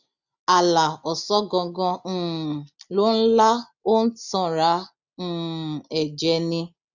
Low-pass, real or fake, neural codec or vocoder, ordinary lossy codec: 7.2 kHz; real; none; none